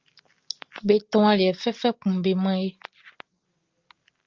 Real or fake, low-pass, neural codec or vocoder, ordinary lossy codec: real; 7.2 kHz; none; Opus, 32 kbps